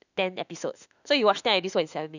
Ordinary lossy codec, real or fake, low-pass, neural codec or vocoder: none; fake; 7.2 kHz; autoencoder, 48 kHz, 32 numbers a frame, DAC-VAE, trained on Japanese speech